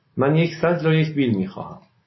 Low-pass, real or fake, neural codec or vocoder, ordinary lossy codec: 7.2 kHz; real; none; MP3, 24 kbps